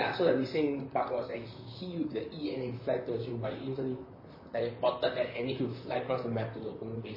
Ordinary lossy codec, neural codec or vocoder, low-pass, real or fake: MP3, 24 kbps; vocoder, 44.1 kHz, 128 mel bands, Pupu-Vocoder; 5.4 kHz; fake